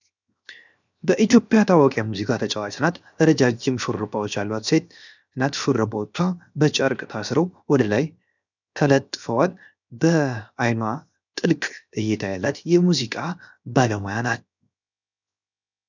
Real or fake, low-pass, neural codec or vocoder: fake; 7.2 kHz; codec, 16 kHz, 0.7 kbps, FocalCodec